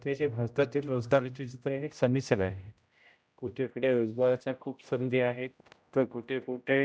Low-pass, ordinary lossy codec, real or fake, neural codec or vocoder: none; none; fake; codec, 16 kHz, 0.5 kbps, X-Codec, HuBERT features, trained on general audio